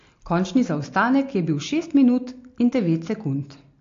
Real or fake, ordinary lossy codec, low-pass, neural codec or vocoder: real; AAC, 48 kbps; 7.2 kHz; none